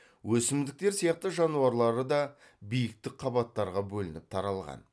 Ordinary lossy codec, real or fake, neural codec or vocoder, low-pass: none; real; none; none